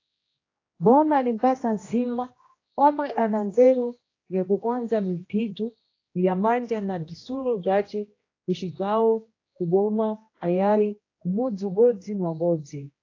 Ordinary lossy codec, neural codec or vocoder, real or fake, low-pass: AAC, 32 kbps; codec, 16 kHz, 1 kbps, X-Codec, HuBERT features, trained on general audio; fake; 7.2 kHz